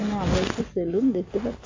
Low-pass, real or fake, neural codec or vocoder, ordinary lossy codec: 7.2 kHz; real; none; AAC, 48 kbps